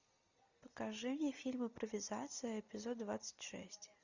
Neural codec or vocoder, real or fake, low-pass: none; real; 7.2 kHz